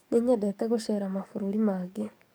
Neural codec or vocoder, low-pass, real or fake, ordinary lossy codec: codec, 44.1 kHz, 7.8 kbps, DAC; none; fake; none